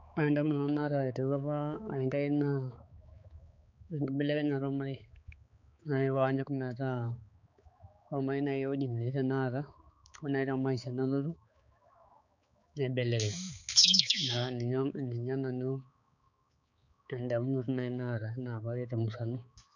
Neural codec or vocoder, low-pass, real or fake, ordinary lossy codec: codec, 16 kHz, 4 kbps, X-Codec, HuBERT features, trained on balanced general audio; 7.2 kHz; fake; none